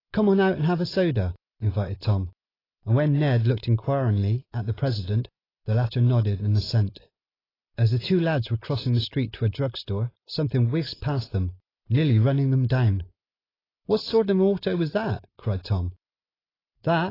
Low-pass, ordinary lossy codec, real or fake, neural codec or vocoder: 5.4 kHz; AAC, 24 kbps; real; none